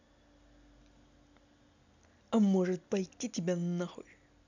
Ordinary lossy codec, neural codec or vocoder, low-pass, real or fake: none; none; 7.2 kHz; real